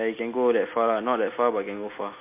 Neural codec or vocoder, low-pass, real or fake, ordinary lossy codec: none; 3.6 kHz; real; MP3, 24 kbps